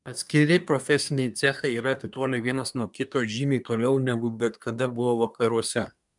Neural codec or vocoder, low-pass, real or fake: codec, 24 kHz, 1 kbps, SNAC; 10.8 kHz; fake